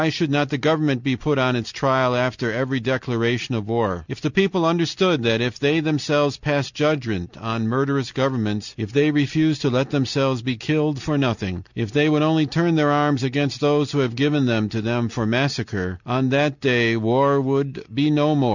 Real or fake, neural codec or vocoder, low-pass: real; none; 7.2 kHz